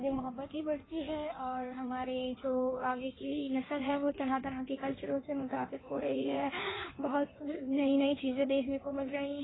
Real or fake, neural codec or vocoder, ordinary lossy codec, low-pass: fake; codec, 16 kHz in and 24 kHz out, 1.1 kbps, FireRedTTS-2 codec; AAC, 16 kbps; 7.2 kHz